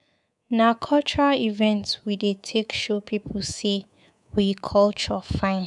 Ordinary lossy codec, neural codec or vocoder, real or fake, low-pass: none; codec, 24 kHz, 3.1 kbps, DualCodec; fake; 10.8 kHz